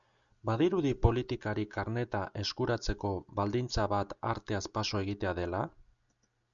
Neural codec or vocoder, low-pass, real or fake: none; 7.2 kHz; real